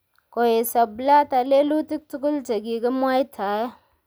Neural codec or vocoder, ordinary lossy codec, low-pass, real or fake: none; none; none; real